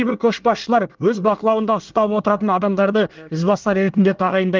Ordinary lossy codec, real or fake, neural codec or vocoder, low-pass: Opus, 32 kbps; fake; codec, 24 kHz, 1 kbps, SNAC; 7.2 kHz